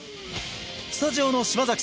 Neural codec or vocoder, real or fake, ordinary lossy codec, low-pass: none; real; none; none